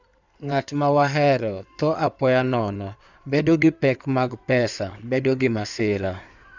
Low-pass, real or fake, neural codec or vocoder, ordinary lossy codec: 7.2 kHz; fake; codec, 16 kHz in and 24 kHz out, 2.2 kbps, FireRedTTS-2 codec; none